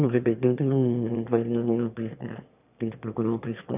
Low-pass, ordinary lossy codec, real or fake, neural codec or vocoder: 3.6 kHz; none; fake; autoencoder, 22.05 kHz, a latent of 192 numbers a frame, VITS, trained on one speaker